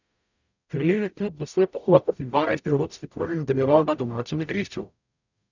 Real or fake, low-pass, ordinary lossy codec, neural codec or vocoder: fake; 7.2 kHz; none; codec, 44.1 kHz, 0.9 kbps, DAC